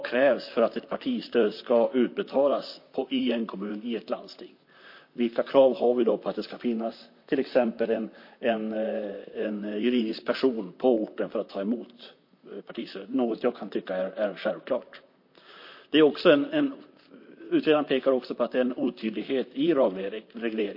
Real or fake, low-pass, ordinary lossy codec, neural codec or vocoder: fake; 5.4 kHz; MP3, 32 kbps; vocoder, 44.1 kHz, 128 mel bands, Pupu-Vocoder